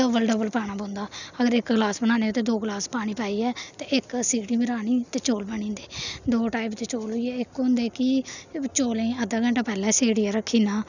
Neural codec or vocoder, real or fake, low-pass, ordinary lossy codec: none; real; 7.2 kHz; none